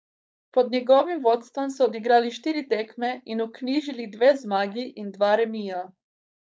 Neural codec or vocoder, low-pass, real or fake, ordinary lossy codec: codec, 16 kHz, 4.8 kbps, FACodec; none; fake; none